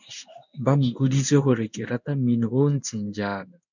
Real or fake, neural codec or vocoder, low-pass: fake; codec, 16 kHz in and 24 kHz out, 1 kbps, XY-Tokenizer; 7.2 kHz